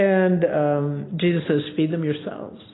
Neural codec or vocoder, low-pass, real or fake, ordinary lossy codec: none; 7.2 kHz; real; AAC, 16 kbps